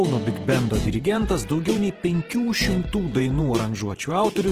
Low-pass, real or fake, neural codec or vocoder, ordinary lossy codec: 14.4 kHz; fake; vocoder, 48 kHz, 128 mel bands, Vocos; Opus, 16 kbps